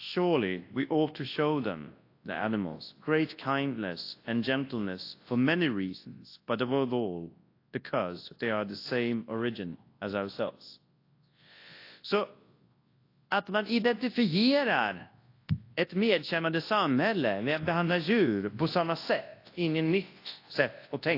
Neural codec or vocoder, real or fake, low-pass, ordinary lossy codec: codec, 24 kHz, 0.9 kbps, WavTokenizer, large speech release; fake; 5.4 kHz; AAC, 32 kbps